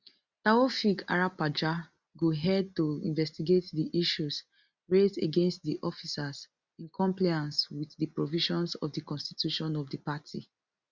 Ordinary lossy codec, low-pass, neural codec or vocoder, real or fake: Opus, 64 kbps; 7.2 kHz; none; real